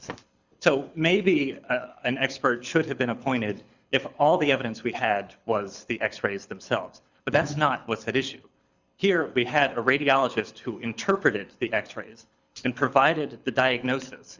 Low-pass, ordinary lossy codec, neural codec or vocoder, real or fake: 7.2 kHz; Opus, 64 kbps; codec, 24 kHz, 6 kbps, HILCodec; fake